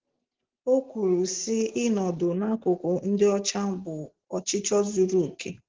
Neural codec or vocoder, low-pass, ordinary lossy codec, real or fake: none; 7.2 kHz; Opus, 16 kbps; real